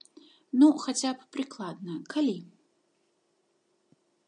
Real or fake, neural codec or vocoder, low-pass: real; none; 9.9 kHz